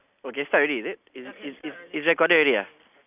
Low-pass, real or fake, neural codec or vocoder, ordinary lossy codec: 3.6 kHz; real; none; none